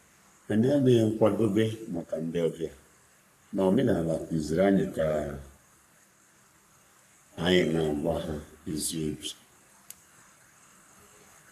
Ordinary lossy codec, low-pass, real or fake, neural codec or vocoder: none; 14.4 kHz; fake; codec, 44.1 kHz, 3.4 kbps, Pupu-Codec